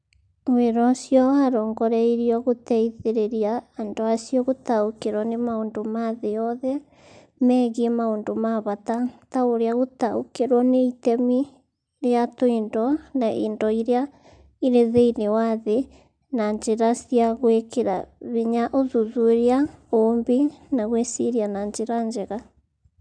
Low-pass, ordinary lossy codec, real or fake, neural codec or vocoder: 9.9 kHz; MP3, 96 kbps; real; none